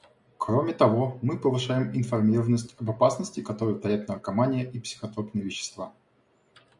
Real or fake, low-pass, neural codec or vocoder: real; 10.8 kHz; none